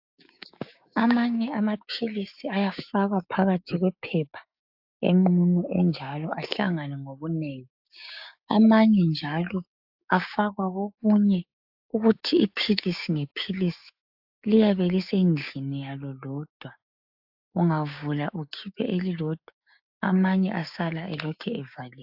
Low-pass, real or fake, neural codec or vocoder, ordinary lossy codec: 5.4 kHz; fake; codec, 16 kHz, 6 kbps, DAC; AAC, 48 kbps